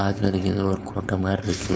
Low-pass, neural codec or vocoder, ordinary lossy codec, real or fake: none; codec, 16 kHz, 4.8 kbps, FACodec; none; fake